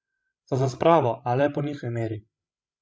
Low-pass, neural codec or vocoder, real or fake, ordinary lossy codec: none; codec, 16 kHz, 16 kbps, FreqCodec, larger model; fake; none